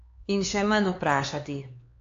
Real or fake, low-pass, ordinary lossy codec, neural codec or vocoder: fake; 7.2 kHz; AAC, 48 kbps; codec, 16 kHz, 4 kbps, X-Codec, HuBERT features, trained on LibriSpeech